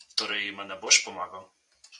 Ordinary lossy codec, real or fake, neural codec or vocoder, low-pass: AAC, 64 kbps; fake; vocoder, 44.1 kHz, 128 mel bands every 256 samples, BigVGAN v2; 10.8 kHz